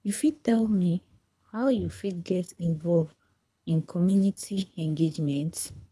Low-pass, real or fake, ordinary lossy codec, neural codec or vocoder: none; fake; none; codec, 24 kHz, 3 kbps, HILCodec